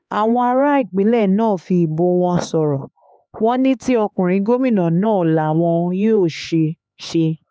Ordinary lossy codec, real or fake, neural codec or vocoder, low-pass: none; fake; codec, 16 kHz, 4 kbps, X-Codec, HuBERT features, trained on LibriSpeech; none